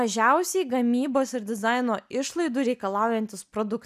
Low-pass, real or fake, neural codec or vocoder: 14.4 kHz; real; none